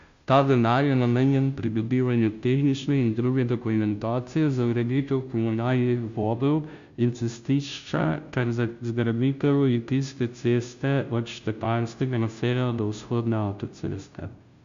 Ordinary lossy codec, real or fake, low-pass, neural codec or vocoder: Opus, 64 kbps; fake; 7.2 kHz; codec, 16 kHz, 0.5 kbps, FunCodec, trained on Chinese and English, 25 frames a second